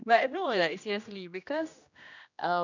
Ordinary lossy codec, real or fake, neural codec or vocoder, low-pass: none; fake; codec, 16 kHz, 1 kbps, X-Codec, HuBERT features, trained on general audio; 7.2 kHz